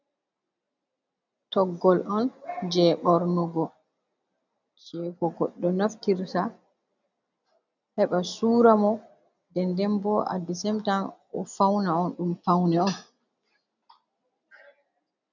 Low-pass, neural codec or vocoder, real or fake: 7.2 kHz; none; real